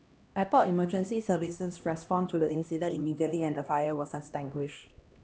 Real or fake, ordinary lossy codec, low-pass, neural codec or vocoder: fake; none; none; codec, 16 kHz, 1 kbps, X-Codec, HuBERT features, trained on LibriSpeech